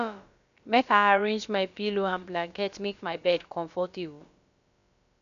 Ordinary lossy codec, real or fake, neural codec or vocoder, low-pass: none; fake; codec, 16 kHz, about 1 kbps, DyCAST, with the encoder's durations; 7.2 kHz